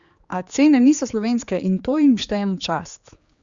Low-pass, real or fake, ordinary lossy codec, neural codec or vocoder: 7.2 kHz; fake; Opus, 64 kbps; codec, 16 kHz, 4 kbps, X-Codec, HuBERT features, trained on general audio